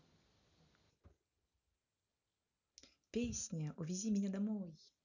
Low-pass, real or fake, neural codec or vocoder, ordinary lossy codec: 7.2 kHz; real; none; none